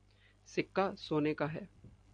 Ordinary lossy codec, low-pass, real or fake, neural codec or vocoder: AAC, 64 kbps; 9.9 kHz; real; none